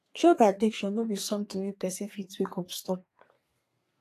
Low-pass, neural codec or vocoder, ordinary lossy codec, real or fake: 14.4 kHz; codec, 32 kHz, 1.9 kbps, SNAC; AAC, 48 kbps; fake